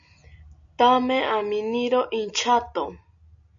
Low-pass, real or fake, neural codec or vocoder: 7.2 kHz; real; none